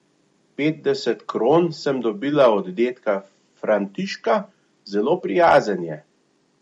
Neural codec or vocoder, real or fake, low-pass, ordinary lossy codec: none; real; 10.8 kHz; MP3, 48 kbps